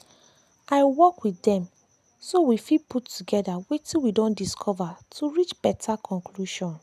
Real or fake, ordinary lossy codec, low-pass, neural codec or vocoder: real; none; 14.4 kHz; none